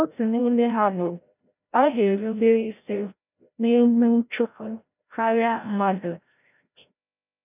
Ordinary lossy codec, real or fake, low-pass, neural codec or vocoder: none; fake; 3.6 kHz; codec, 16 kHz, 0.5 kbps, FreqCodec, larger model